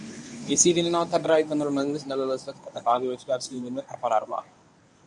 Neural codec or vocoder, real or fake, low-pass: codec, 24 kHz, 0.9 kbps, WavTokenizer, medium speech release version 1; fake; 10.8 kHz